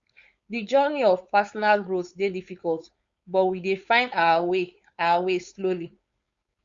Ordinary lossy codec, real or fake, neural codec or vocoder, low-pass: Opus, 64 kbps; fake; codec, 16 kHz, 4.8 kbps, FACodec; 7.2 kHz